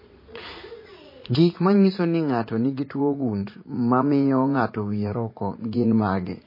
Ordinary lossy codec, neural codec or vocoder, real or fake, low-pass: MP3, 24 kbps; vocoder, 44.1 kHz, 80 mel bands, Vocos; fake; 5.4 kHz